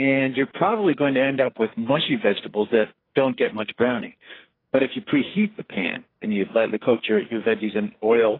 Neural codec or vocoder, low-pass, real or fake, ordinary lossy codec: codec, 44.1 kHz, 2.6 kbps, SNAC; 5.4 kHz; fake; AAC, 24 kbps